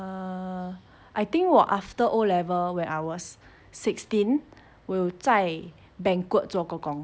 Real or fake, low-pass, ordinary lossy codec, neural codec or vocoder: real; none; none; none